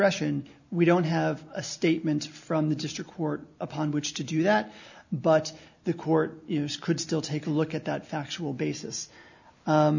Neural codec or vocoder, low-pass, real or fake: none; 7.2 kHz; real